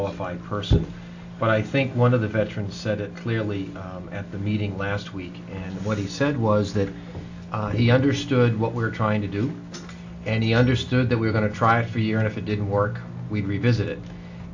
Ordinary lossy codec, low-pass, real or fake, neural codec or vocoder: AAC, 48 kbps; 7.2 kHz; real; none